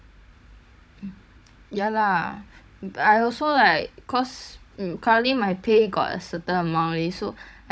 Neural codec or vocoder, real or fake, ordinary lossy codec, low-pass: codec, 16 kHz, 16 kbps, FunCodec, trained on Chinese and English, 50 frames a second; fake; none; none